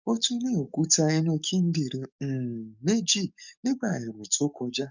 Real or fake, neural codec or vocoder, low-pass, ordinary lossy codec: fake; codec, 44.1 kHz, 7.8 kbps, DAC; 7.2 kHz; none